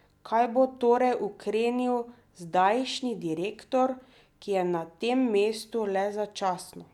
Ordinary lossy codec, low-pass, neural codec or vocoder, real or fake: none; 19.8 kHz; none; real